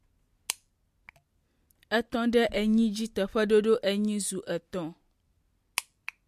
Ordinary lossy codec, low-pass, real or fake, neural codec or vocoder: MP3, 64 kbps; 14.4 kHz; real; none